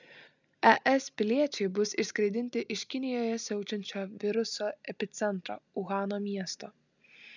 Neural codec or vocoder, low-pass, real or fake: none; 7.2 kHz; real